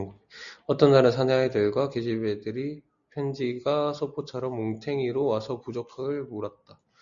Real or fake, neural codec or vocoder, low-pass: real; none; 7.2 kHz